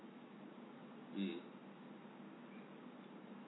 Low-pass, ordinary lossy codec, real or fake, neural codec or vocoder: 7.2 kHz; AAC, 16 kbps; real; none